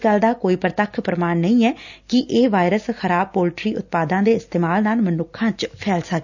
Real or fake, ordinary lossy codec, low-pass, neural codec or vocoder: real; none; 7.2 kHz; none